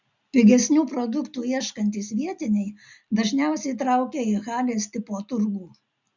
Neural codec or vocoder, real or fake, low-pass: none; real; 7.2 kHz